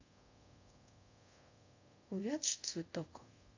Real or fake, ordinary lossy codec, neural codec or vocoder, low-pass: fake; AAC, 48 kbps; codec, 24 kHz, 0.5 kbps, DualCodec; 7.2 kHz